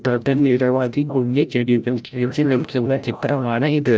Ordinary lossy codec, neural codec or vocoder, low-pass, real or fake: none; codec, 16 kHz, 0.5 kbps, FreqCodec, larger model; none; fake